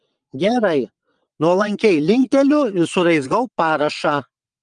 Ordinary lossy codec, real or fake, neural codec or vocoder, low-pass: Opus, 32 kbps; fake; vocoder, 22.05 kHz, 80 mel bands, WaveNeXt; 9.9 kHz